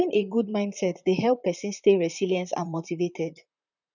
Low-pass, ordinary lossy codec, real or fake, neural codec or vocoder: 7.2 kHz; none; fake; vocoder, 44.1 kHz, 128 mel bands, Pupu-Vocoder